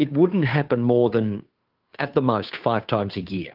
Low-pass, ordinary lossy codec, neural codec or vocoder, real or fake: 5.4 kHz; Opus, 24 kbps; codec, 16 kHz, 2 kbps, FunCodec, trained on Chinese and English, 25 frames a second; fake